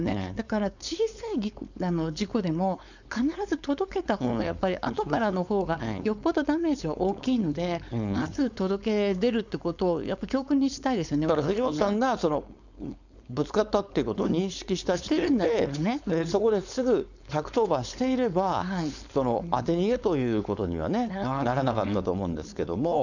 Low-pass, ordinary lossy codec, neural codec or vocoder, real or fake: 7.2 kHz; none; codec, 16 kHz, 4.8 kbps, FACodec; fake